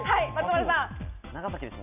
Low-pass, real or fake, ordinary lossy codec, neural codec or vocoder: 3.6 kHz; real; none; none